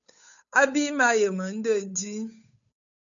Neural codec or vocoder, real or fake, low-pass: codec, 16 kHz, 8 kbps, FunCodec, trained on Chinese and English, 25 frames a second; fake; 7.2 kHz